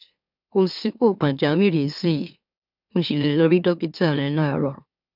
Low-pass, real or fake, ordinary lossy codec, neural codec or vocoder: 5.4 kHz; fake; none; autoencoder, 44.1 kHz, a latent of 192 numbers a frame, MeloTTS